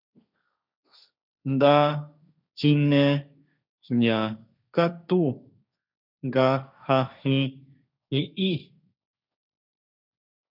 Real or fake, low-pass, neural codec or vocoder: fake; 5.4 kHz; codec, 16 kHz, 1.1 kbps, Voila-Tokenizer